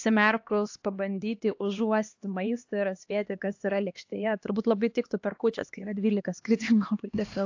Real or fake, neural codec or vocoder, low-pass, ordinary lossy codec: fake; codec, 16 kHz, 2 kbps, X-Codec, HuBERT features, trained on LibriSpeech; 7.2 kHz; AAC, 48 kbps